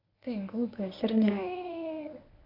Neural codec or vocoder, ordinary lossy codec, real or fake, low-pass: codec, 24 kHz, 0.9 kbps, WavTokenizer, medium speech release version 1; none; fake; 5.4 kHz